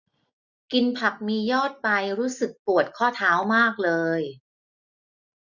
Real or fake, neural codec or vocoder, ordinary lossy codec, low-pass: real; none; none; 7.2 kHz